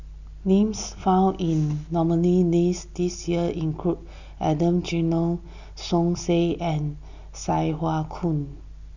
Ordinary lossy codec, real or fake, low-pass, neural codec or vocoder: none; real; 7.2 kHz; none